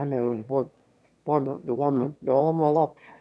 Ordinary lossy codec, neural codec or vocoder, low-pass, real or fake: none; autoencoder, 22.05 kHz, a latent of 192 numbers a frame, VITS, trained on one speaker; none; fake